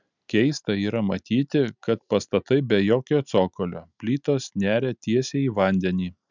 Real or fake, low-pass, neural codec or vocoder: real; 7.2 kHz; none